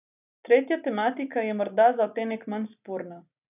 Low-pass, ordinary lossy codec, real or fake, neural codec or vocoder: 3.6 kHz; none; real; none